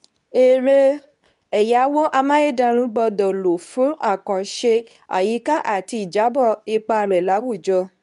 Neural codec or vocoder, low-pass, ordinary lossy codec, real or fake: codec, 24 kHz, 0.9 kbps, WavTokenizer, medium speech release version 2; 10.8 kHz; none; fake